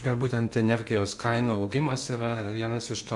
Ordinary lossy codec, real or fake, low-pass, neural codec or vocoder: MP3, 64 kbps; fake; 10.8 kHz; codec, 16 kHz in and 24 kHz out, 0.8 kbps, FocalCodec, streaming, 65536 codes